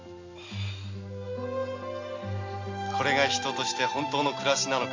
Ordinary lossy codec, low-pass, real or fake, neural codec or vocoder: AAC, 48 kbps; 7.2 kHz; real; none